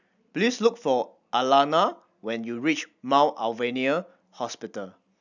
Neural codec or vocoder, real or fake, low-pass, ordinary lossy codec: none; real; 7.2 kHz; none